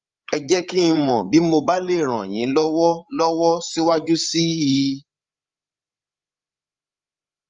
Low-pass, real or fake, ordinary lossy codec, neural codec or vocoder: 7.2 kHz; fake; Opus, 32 kbps; codec, 16 kHz, 8 kbps, FreqCodec, larger model